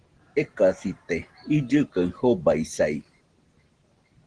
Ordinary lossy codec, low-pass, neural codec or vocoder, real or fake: Opus, 16 kbps; 9.9 kHz; none; real